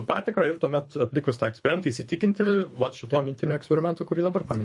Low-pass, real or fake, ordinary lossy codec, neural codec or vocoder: 10.8 kHz; fake; MP3, 48 kbps; codec, 24 kHz, 3 kbps, HILCodec